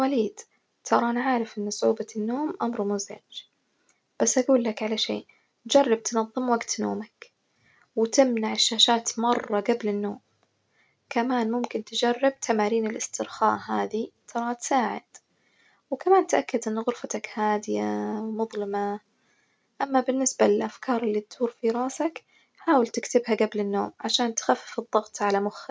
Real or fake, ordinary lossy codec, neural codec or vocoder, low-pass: real; none; none; none